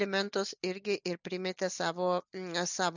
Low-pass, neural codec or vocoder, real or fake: 7.2 kHz; none; real